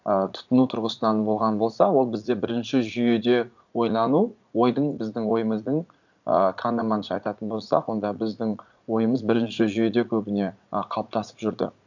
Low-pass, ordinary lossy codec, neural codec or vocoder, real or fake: 7.2 kHz; none; vocoder, 44.1 kHz, 80 mel bands, Vocos; fake